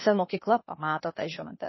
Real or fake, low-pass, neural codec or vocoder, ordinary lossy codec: fake; 7.2 kHz; codec, 16 kHz, 0.8 kbps, ZipCodec; MP3, 24 kbps